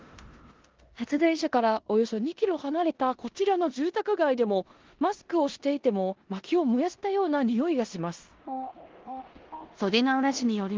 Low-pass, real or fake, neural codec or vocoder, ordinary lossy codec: 7.2 kHz; fake; codec, 16 kHz in and 24 kHz out, 0.9 kbps, LongCat-Audio-Codec, four codebook decoder; Opus, 16 kbps